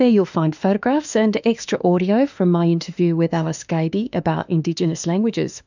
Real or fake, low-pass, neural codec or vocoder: fake; 7.2 kHz; autoencoder, 48 kHz, 32 numbers a frame, DAC-VAE, trained on Japanese speech